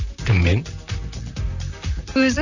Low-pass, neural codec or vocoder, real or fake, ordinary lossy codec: 7.2 kHz; vocoder, 44.1 kHz, 128 mel bands, Pupu-Vocoder; fake; none